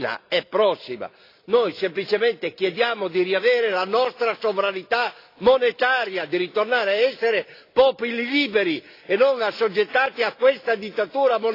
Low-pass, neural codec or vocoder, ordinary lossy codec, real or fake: 5.4 kHz; none; AAC, 32 kbps; real